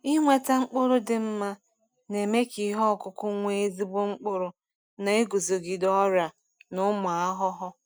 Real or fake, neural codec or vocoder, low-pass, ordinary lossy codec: real; none; none; none